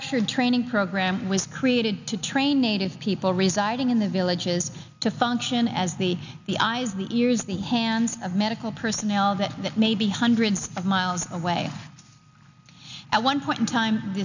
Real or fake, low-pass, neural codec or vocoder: real; 7.2 kHz; none